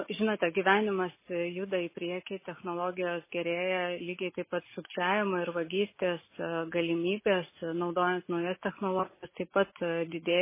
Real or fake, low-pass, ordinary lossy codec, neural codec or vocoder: real; 3.6 kHz; MP3, 16 kbps; none